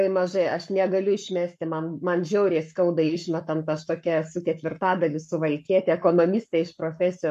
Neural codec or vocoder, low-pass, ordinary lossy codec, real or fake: codec, 44.1 kHz, 7.8 kbps, Pupu-Codec; 14.4 kHz; MP3, 64 kbps; fake